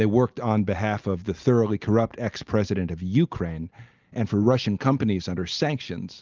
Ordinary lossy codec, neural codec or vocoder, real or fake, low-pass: Opus, 24 kbps; none; real; 7.2 kHz